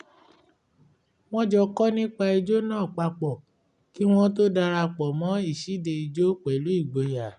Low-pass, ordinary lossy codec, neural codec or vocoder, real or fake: 10.8 kHz; none; none; real